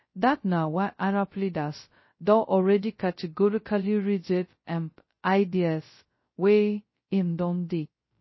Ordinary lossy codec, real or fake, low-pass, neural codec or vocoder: MP3, 24 kbps; fake; 7.2 kHz; codec, 16 kHz, 0.2 kbps, FocalCodec